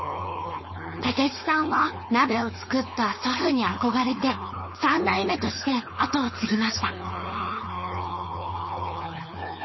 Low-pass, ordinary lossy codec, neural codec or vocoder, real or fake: 7.2 kHz; MP3, 24 kbps; codec, 16 kHz, 4.8 kbps, FACodec; fake